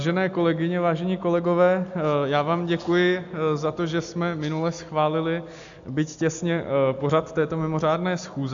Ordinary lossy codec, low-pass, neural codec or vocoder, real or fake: AAC, 64 kbps; 7.2 kHz; none; real